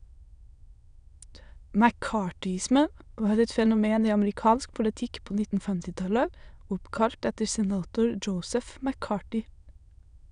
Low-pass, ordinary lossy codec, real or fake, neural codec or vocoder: 9.9 kHz; none; fake; autoencoder, 22.05 kHz, a latent of 192 numbers a frame, VITS, trained on many speakers